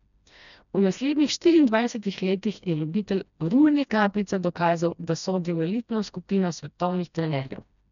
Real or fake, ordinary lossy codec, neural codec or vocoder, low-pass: fake; none; codec, 16 kHz, 1 kbps, FreqCodec, smaller model; 7.2 kHz